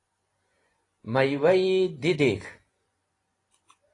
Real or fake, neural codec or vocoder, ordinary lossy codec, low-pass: fake; vocoder, 24 kHz, 100 mel bands, Vocos; AAC, 32 kbps; 10.8 kHz